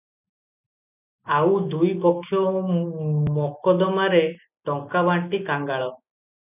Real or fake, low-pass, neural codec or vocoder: real; 3.6 kHz; none